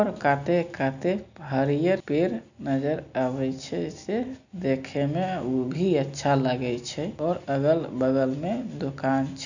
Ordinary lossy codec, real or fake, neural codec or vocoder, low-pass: none; real; none; 7.2 kHz